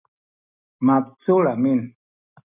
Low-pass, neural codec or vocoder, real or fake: 3.6 kHz; none; real